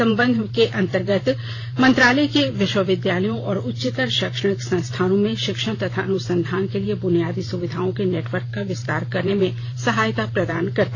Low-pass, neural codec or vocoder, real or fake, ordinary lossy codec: 7.2 kHz; vocoder, 44.1 kHz, 128 mel bands every 512 samples, BigVGAN v2; fake; AAC, 32 kbps